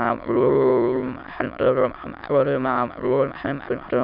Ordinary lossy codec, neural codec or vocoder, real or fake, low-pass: none; autoencoder, 22.05 kHz, a latent of 192 numbers a frame, VITS, trained on many speakers; fake; 5.4 kHz